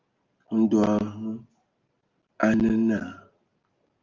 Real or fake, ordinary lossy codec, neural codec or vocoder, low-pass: real; Opus, 24 kbps; none; 7.2 kHz